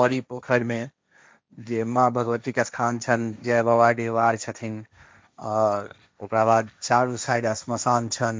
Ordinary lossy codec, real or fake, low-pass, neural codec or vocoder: none; fake; none; codec, 16 kHz, 1.1 kbps, Voila-Tokenizer